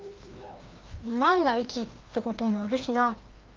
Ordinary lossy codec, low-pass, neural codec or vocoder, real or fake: Opus, 32 kbps; 7.2 kHz; codec, 16 kHz, 1 kbps, FreqCodec, larger model; fake